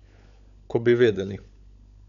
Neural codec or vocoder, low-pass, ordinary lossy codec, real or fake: codec, 16 kHz, 16 kbps, FunCodec, trained on LibriTTS, 50 frames a second; 7.2 kHz; none; fake